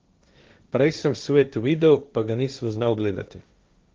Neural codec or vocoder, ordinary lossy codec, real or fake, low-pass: codec, 16 kHz, 1.1 kbps, Voila-Tokenizer; Opus, 24 kbps; fake; 7.2 kHz